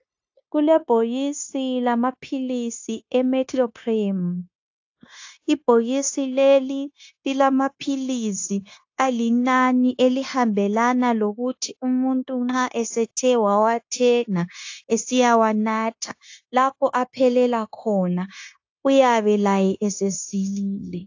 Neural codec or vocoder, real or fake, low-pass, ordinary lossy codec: codec, 16 kHz, 0.9 kbps, LongCat-Audio-Codec; fake; 7.2 kHz; AAC, 48 kbps